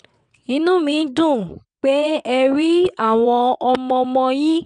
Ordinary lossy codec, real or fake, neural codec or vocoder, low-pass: none; fake; vocoder, 22.05 kHz, 80 mel bands, WaveNeXt; 9.9 kHz